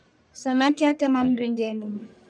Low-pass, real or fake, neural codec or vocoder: 9.9 kHz; fake; codec, 44.1 kHz, 1.7 kbps, Pupu-Codec